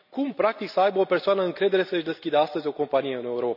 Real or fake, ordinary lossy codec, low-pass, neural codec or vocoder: real; none; 5.4 kHz; none